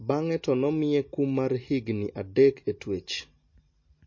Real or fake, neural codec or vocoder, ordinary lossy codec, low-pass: real; none; MP3, 32 kbps; 7.2 kHz